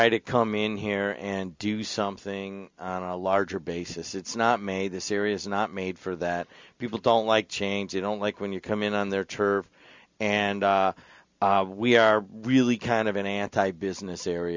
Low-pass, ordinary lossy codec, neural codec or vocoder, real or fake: 7.2 kHz; MP3, 64 kbps; none; real